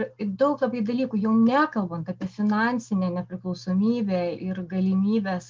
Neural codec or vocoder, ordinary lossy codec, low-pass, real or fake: none; Opus, 32 kbps; 7.2 kHz; real